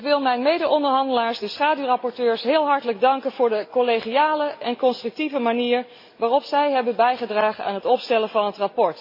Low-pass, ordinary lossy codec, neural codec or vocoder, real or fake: 5.4 kHz; MP3, 24 kbps; none; real